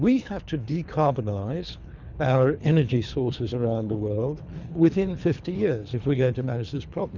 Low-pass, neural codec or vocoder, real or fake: 7.2 kHz; codec, 24 kHz, 3 kbps, HILCodec; fake